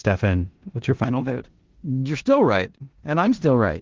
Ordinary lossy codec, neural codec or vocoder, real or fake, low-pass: Opus, 16 kbps; codec, 16 kHz in and 24 kHz out, 0.9 kbps, LongCat-Audio-Codec, four codebook decoder; fake; 7.2 kHz